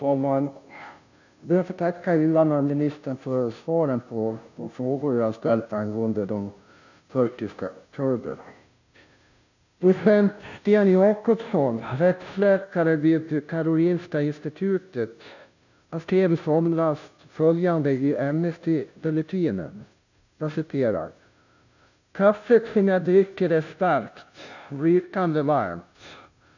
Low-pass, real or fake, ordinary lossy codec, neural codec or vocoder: 7.2 kHz; fake; none; codec, 16 kHz, 0.5 kbps, FunCodec, trained on Chinese and English, 25 frames a second